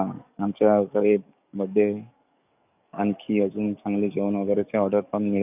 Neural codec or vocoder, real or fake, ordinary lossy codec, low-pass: codec, 44.1 kHz, 7.8 kbps, DAC; fake; none; 3.6 kHz